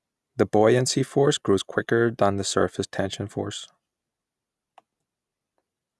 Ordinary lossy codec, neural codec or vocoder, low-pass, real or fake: none; none; none; real